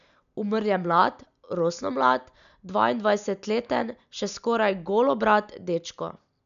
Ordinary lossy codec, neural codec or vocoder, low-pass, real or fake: none; none; 7.2 kHz; real